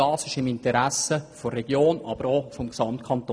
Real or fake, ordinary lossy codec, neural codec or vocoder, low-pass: real; none; none; none